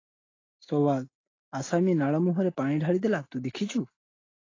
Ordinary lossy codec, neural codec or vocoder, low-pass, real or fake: AAC, 32 kbps; none; 7.2 kHz; real